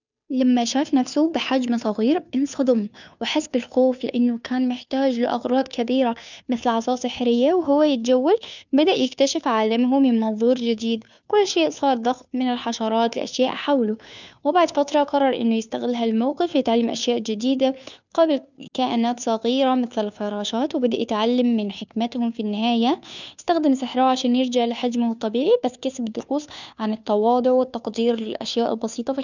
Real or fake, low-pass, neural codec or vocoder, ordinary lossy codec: fake; 7.2 kHz; codec, 16 kHz, 2 kbps, FunCodec, trained on Chinese and English, 25 frames a second; none